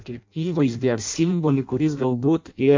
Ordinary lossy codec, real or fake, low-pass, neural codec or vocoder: MP3, 48 kbps; fake; 7.2 kHz; codec, 16 kHz in and 24 kHz out, 0.6 kbps, FireRedTTS-2 codec